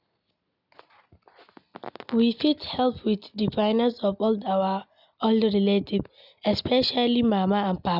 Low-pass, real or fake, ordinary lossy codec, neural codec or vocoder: 5.4 kHz; real; none; none